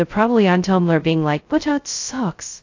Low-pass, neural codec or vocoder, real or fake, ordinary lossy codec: 7.2 kHz; codec, 16 kHz, 0.2 kbps, FocalCodec; fake; AAC, 48 kbps